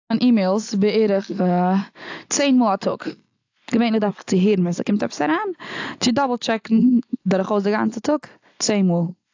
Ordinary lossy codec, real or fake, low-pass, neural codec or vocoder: AAC, 48 kbps; real; 7.2 kHz; none